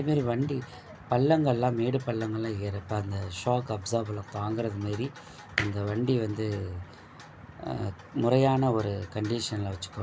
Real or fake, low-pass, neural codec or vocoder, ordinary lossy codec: real; none; none; none